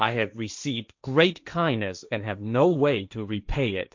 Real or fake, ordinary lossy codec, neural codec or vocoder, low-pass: fake; MP3, 64 kbps; codec, 16 kHz, 1.1 kbps, Voila-Tokenizer; 7.2 kHz